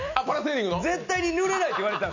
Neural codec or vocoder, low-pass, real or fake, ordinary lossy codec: none; 7.2 kHz; real; none